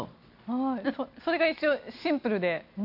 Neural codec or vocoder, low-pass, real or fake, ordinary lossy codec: none; 5.4 kHz; real; none